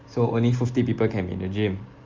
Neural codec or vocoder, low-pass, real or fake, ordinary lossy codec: none; 7.2 kHz; real; Opus, 32 kbps